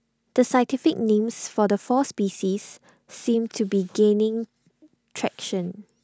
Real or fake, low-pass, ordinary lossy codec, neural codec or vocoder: real; none; none; none